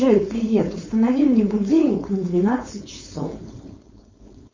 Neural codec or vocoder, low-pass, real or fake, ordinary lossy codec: codec, 16 kHz, 4.8 kbps, FACodec; 7.2 kHz; fake; MP3, 32 kbps